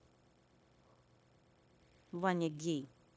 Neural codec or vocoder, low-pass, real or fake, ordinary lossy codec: codec, 16 kHz, 0.9 kbps, LongCat-Audio-Codec; none; fake; none